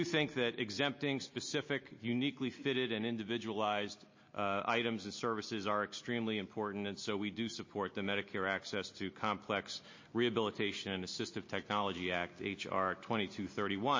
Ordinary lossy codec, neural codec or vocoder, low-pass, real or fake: MP3, 32 kbps; none; 7.2 kHz; real